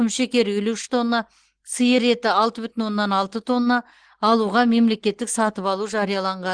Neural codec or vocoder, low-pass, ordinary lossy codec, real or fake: none; 9.9 kHz; Opus, 16 kbps; real